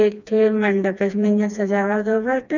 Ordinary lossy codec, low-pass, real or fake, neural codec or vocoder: none; 7.2 kHz; fake; codec, 16 kHz, 2 kbps, FreqCodec, smaller model